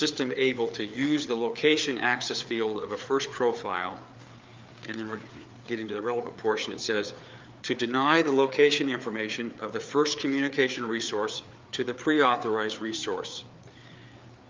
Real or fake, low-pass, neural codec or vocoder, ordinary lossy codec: fake; 7.2 kHz; codec, 16 kHz, 4 kbps, FreqCodec, larger model; Opus, 24 kbps